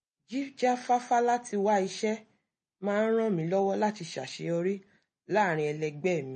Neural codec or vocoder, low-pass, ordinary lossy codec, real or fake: none; 10.8 kHz; MP3, 32 kbps; real